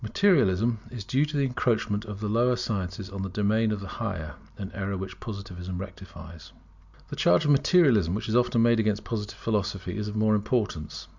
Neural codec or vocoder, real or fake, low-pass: none; real; 7.2 kHz